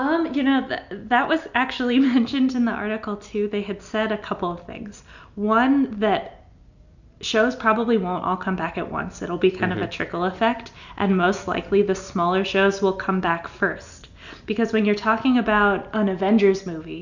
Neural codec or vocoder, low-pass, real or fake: none; 7.2 kHz; real